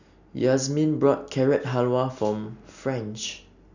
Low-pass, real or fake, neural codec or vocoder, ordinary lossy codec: 7.2 kHz; real; none; none